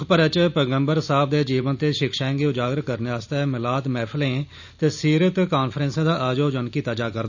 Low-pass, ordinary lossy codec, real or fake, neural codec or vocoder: 7.2 kHz; none; real; none